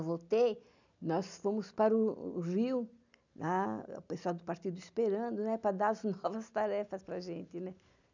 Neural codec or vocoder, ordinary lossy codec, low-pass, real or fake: none; none; 7.2 kHz; real